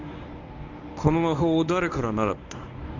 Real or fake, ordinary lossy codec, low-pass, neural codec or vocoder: fake; none; 7.2 kHz; codec, 24 kHz, 0.9 kbps, WavTokenizer, medium speech release version 1